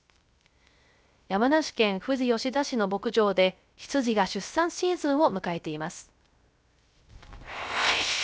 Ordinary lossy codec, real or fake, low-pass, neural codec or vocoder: none; fake; none; codec, 16 kHz, 0.3 kbps, FocalCodec